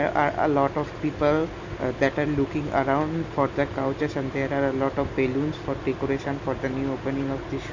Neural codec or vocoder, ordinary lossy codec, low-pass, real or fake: none; none; 7.2 kHz; real